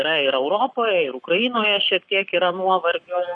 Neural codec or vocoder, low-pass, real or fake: codec, 44.1 kHz, 7.8 kbps, Pupu-Codec; 9.9 kHz; fake